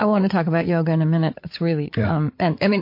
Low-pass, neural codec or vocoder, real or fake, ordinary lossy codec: 5.4 kHz; codec, 16 kHz, 8 kbps, FreqCodec, larger model; fake; MP3, 32 kbps